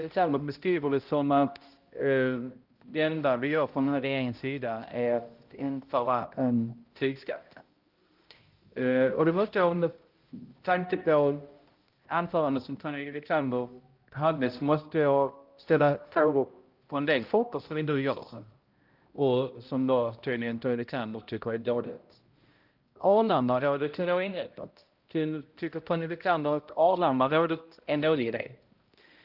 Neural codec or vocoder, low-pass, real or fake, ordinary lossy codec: codec, 16 kHz, 0.5 kbps, X-Codec, HuBERT features, trained on balanced general audio; 5.4 kHz; fake; Opus, 32 kbps